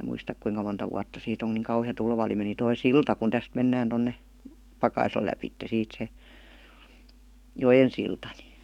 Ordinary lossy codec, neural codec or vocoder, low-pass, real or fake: none; codec, 44.1 kHz, 7.8 kbps, DAC; 19.8 kHz; fake